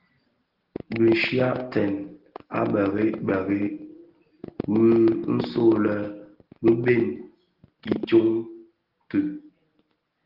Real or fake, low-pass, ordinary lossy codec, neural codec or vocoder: real; 5.4 kHz; Opus, 16 kbps; none